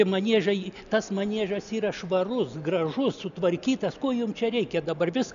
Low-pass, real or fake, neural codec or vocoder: 7.2 kHz; real; none